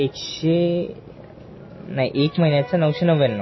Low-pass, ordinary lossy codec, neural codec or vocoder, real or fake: 7.2 kHz; MP3, 24 kbps; none; real